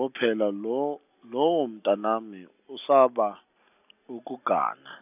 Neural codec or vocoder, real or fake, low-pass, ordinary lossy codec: none; real; 3.6 kHz; none